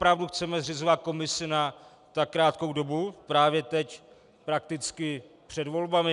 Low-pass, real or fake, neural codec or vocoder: 10.8 kHz; real; none